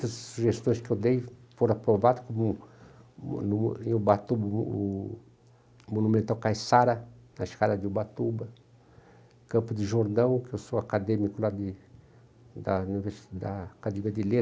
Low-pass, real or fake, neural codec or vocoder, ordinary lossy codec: none; real; none; none